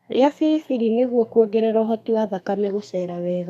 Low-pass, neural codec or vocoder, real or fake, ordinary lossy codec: 14.4 kHz; codec, 32 kHz, 1.9 kbps, SNAC; fake; none